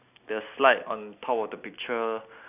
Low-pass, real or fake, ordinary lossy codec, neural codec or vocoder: 3.6 kHz; real; none; none